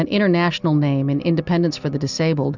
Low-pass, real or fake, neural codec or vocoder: 7.2 kHz; real; none